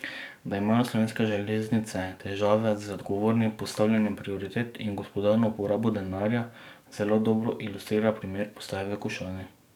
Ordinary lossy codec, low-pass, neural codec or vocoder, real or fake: none; 19.8 kHz; codec, 44.1 kHz, 7.8 kbps, DAC; fake